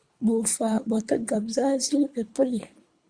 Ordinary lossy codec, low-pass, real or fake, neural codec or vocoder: Opus, 64 kbps; 9.9 kHz; fake; codec, 24 kHz, 3 kbps, HILCodec